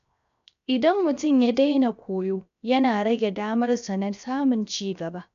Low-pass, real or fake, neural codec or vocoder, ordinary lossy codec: 7.2 kHz; fake; codec, 16 kHz, 0.7 kbps, FocalCodec; none